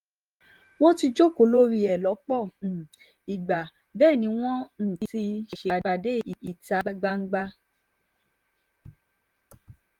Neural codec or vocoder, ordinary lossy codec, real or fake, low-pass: vocoder, 44.1 kHz, 128 mel bands, Pupu-Vocoder; Opus, 32 kbps; fake; 19.8 kHz